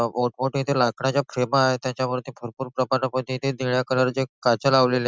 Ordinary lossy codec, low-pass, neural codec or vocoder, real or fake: none; none; none; real